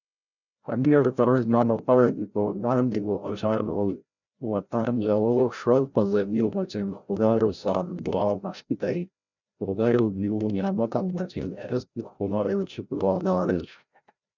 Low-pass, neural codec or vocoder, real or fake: 7.2 kHz; codec, 16 kHz, 0.5 kbps, FreqCodec, larger model; fake